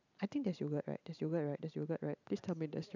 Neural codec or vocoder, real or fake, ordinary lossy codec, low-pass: none; real; none; 7.2 kHz